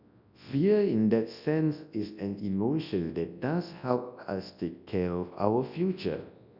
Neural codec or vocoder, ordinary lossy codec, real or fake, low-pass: codec, 24 kHz, 0.9 kbps, WavTokenizer, large speech release; none; fake; 5.4 kHz